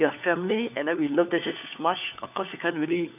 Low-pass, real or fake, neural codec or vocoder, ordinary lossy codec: 3.6 kHz; fake; codec, 16 kHz, 4 kbps, FunCodec, trained on LibriTTS, 50 frames a second; none